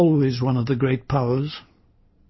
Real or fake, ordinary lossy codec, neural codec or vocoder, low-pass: real; MP3, 24 kbps; none; 7.2 kHz